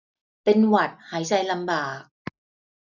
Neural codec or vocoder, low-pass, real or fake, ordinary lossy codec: none; 7.2 kHz; real; none